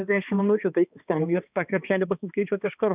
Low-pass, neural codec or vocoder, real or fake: 3.6 kHz; codec, 16 kHz, 2 kbps, X-Codec, HuBERT features, trained on balanced general audio; fake